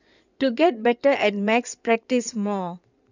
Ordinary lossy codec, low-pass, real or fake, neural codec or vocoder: none; 7.2 kHz; fake; codec, 16 kHz in and 24 kHz out, 2.2 kbps, FireRedTTS-2 codec